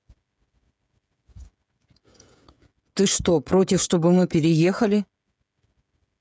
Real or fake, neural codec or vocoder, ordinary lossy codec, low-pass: fake; codec, 16 kHz, 16 kbps, FreqCodec, smaller model; none; none